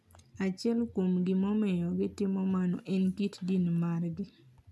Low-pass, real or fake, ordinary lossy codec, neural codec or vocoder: none; real; none; none